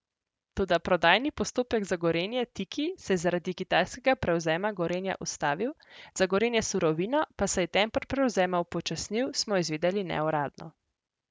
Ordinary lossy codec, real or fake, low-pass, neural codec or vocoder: none; real; none; none